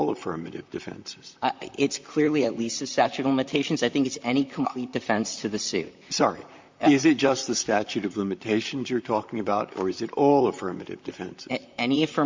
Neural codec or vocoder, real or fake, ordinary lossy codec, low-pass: vocoder, 44.1 kHz, 128 mel bands, Pupu-Vocoder; fake; MP3, 64 kbps; 7.2 kHz